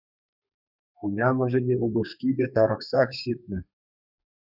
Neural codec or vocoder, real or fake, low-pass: codec, 32 kHz, 1.9 kbps, SNAC; fake; 5.4 kHz